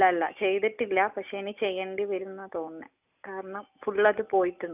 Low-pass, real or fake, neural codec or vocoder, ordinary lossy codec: 3.6 kHz; real; none; none